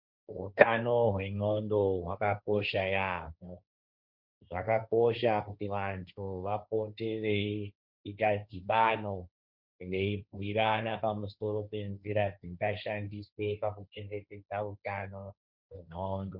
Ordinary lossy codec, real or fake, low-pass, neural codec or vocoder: Opus, 64 kbps; fake; 5.4 kHz; codec, 16 kHz, 1.1 kbps, Voila-Tokenizer